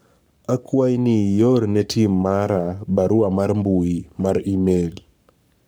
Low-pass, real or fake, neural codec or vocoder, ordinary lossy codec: none; fake; codec, 44.1 kHz, 7.8 kbps, Pupu-Codec; none